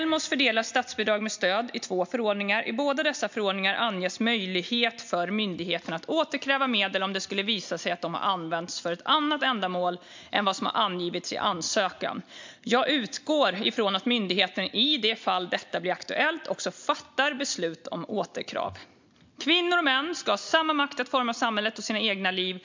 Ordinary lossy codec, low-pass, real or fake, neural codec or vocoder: MP3, 64 kbps; 7.2 kHz; real; none